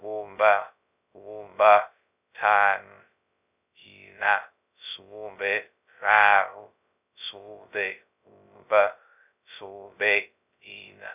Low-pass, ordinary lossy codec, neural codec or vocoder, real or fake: 3.6 kHz; none; codec, 16 kHz, 0.2 kbps, FocalCodec; fake